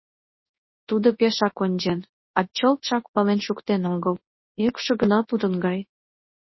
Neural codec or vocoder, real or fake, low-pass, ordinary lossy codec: codec, 24 kHz, 0.9 kbps, WavTokenizer, large speech release; fake; 7.2 kHz; MP3, 24 kbps